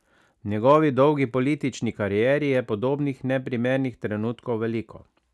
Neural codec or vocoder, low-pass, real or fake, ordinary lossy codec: none; none; real; none